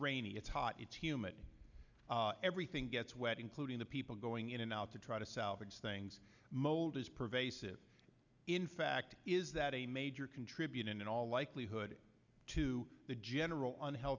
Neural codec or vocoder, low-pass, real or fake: none; 7.2 kHz; real